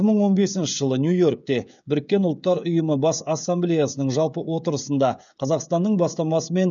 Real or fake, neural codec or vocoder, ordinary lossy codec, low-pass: fake; codec, 16 kHz, 16 kbps, FreqCodec, smaller model; none; 7.2 kHz